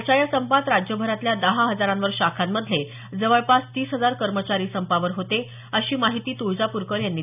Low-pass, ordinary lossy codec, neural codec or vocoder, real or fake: 3.6 kHz; none; none; real